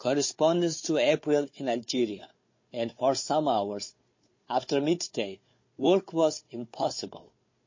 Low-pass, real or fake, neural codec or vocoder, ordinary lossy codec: 7.2 kHz; fake; codec, 16 kHz, 4 kbps, FunCodec, trained on Chinese and English, 50 frames a second; MP3, 32 kbps